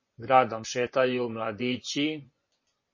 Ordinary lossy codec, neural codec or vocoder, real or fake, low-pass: MP3, 32 kbps; vocoder, 24 kHz, 100 mel bands, Vocos; fake; 7.2 kHz